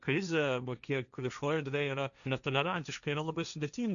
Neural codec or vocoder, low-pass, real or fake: codec, 16 kHz, 1.1 kbps, Voila-Tokenizer; 7.2 kHz; fake